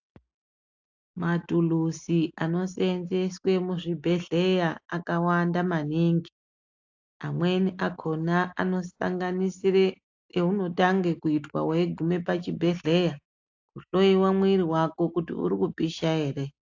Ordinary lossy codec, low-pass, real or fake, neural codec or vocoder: AAC, 48 kbps; 7.2 kHz; real; none